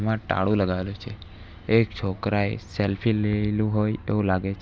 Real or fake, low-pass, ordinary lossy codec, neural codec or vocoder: real; none; none; none